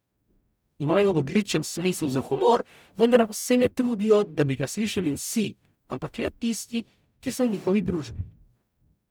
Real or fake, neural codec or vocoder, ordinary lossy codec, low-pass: fake; codec, 44.1 kHz, 0.9 kbps, DAC; none; none